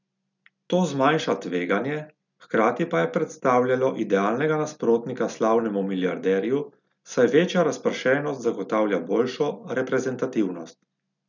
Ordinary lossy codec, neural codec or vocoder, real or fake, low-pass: none; none; real; 7.2 kHz